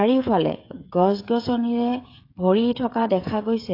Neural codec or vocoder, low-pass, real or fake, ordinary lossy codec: codec, 16 kHz, 16 kbps, FreqCodec, smaller model; 5.4 kHz; fake; AAC, 32 kbps